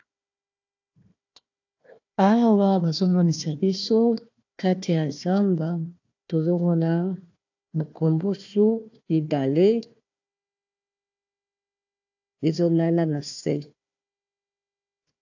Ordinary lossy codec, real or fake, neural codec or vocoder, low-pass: AAC, 48 kbps; fake; codec, 16 kHz, 1 kbps, FunCodec, trained on Chinese and English, 50 frames a second; 7.2 kHz